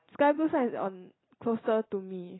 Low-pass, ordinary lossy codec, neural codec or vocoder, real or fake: 7.2 kHz; AAC, 16 kbps; vocoder, 44.1 kHz, 128 mel bands every 256 samples, BigVGAN v2; fake